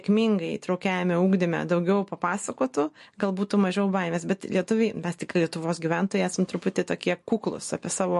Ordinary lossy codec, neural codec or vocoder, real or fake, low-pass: MP3, 48 kbps; none; real; 14.4 kHz